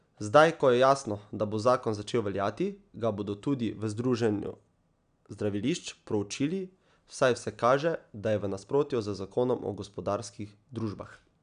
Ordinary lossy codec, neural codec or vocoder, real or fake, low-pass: none; none; real; 9.9 kHz